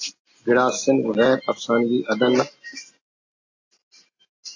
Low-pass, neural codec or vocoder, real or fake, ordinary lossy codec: 7.2 kHz; none; real; AAC, 48 kbps